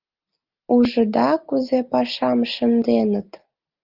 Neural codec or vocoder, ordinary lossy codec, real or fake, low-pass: none; Opus, 32 kbps; real; 5.4 kHz